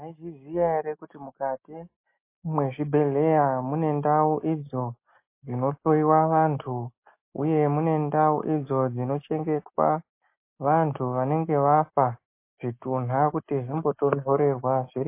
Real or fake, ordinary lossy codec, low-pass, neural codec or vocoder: real; MP3, 24 kbps; 3.6 kHz; none